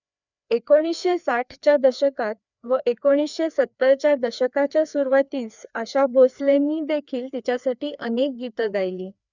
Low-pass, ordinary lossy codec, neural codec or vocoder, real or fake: 7.2 kHz; none; codec, 16 kHz, 2 kbps, FreqCodec, larger model; fake